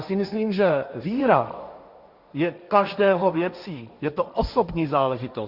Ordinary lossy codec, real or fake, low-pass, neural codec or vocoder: Opus, 64 kbps; fake; 5.4 kHz; codec, 16 kHz, 1.1 kbps, Voila-Tokenizer